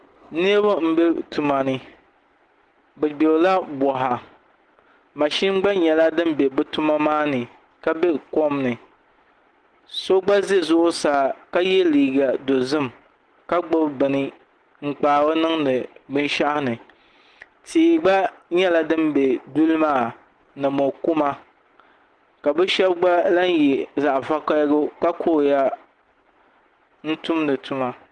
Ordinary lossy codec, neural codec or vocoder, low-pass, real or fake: Opus, 16 kbps; none; 9.9 kHz; real